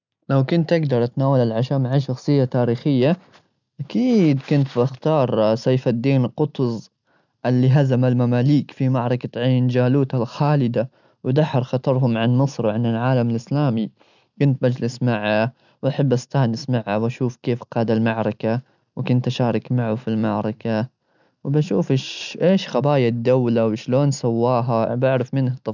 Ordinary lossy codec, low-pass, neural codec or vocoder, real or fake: none; 7.2 kHz; none; real